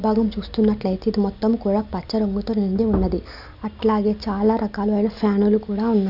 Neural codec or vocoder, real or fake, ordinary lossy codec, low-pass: none; real; none; 5.4 kHz